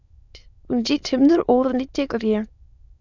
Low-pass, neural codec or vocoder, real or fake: 7.2 kHz; autoencoder, 22.05 kHz, a latent of 192 numbers a frame, VITS, trained on many speakers; fake